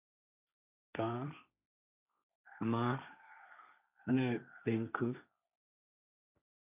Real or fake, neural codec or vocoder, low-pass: fake; codec, 16 kHz, 1.1 kbps, Voila-Tokenizer; 3.6 kHz